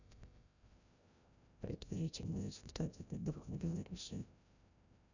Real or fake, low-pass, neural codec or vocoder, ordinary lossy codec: fake; 7.2 kHz; codec, 16 kHz, 0.5 kbps, FreqCodec, larger model; none